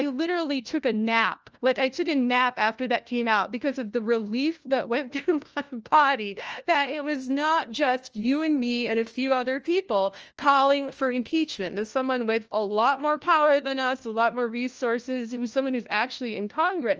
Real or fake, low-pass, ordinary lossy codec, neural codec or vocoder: fake; 7.2 kHz; Opus, 24 kbps; codec, 16 kHz, 1 kbps, FunCodec, trained on LibriTTS, 50 frames a second